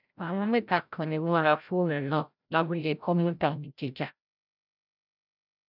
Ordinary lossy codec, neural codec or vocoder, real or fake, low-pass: none; codec, 16 kHz, 0.5 kbps, FreqCodec, larger model; fake; 5.4 kHz